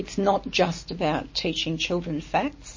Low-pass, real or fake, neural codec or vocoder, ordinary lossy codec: 7.2 kHz; real; none; MP3, 32 kbps